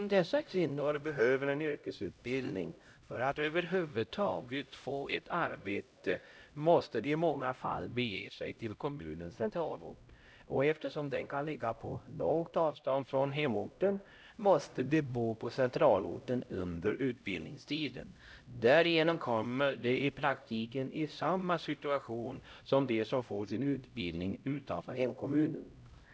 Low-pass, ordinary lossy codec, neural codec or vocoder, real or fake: none; none; codec, 16 kHz, 0.5 kbps, X-Codec, HuBERT features, trained on LibriSpeech; fake